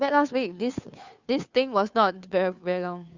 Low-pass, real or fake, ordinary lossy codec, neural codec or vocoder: 7.2 kHz; fake; Opus, 64 kbps; codec, 16 kHz, 2 kbps, FunCodec, trained on Chinese and English, 25 frames a second